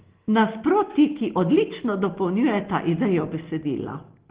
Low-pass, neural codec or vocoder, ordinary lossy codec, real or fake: 3.6 kHz; none; Opus, 16 kbps; real